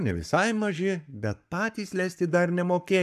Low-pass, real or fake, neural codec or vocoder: 14.4 kHz; fake; codec, 44.1 kHz, 7.8 kbps, Pupu-Codec